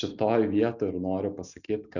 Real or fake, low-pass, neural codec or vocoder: real; 7.2 kHz; none